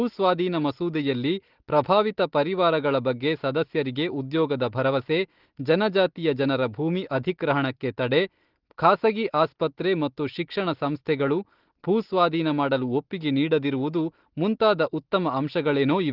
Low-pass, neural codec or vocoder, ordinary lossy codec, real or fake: 5.4 kHz; none; Opus, 16 kbps; real